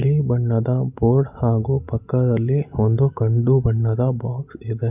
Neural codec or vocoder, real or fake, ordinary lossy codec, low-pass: none; real; none; 3.6 kHz